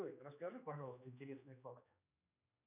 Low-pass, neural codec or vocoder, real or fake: 3.6 kHz; codec, 16 kHz, 2 kbps, X-Codec, HuBERT features, trained on general audio; fake